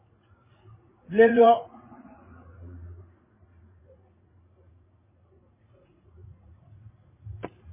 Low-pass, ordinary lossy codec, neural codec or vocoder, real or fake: 3.6 kHz; MP3, 16 kbps; vocoder, 44.1 kHz, 80 mel bands, Vocos; fake